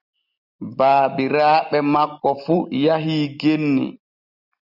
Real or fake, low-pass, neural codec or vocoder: real; 5.4 kHz; none